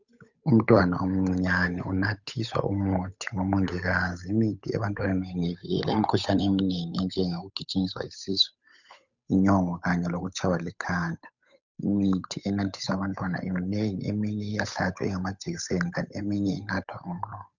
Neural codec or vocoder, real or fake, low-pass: codec, 16 kHz, 8 kbps, FunCodec, trained on Chinese and English, 25 frames a second; fake; 7.2 kHz